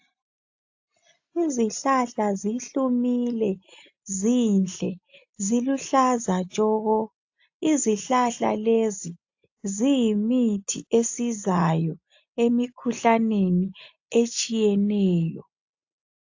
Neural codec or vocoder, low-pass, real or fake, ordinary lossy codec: none; 7.2 kHz; real; AAC, 48 kbps